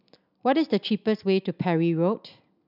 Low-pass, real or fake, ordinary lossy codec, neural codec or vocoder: 5.4 kHz; real; none; none